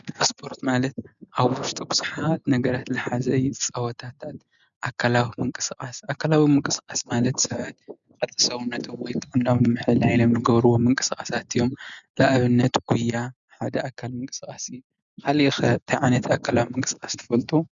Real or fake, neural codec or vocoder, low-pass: real; none; 7.2 kHz